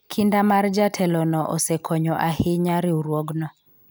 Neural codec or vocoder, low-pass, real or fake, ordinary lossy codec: none; none; real; none